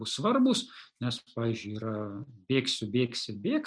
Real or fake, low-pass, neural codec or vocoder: real; 9.9 kHz; none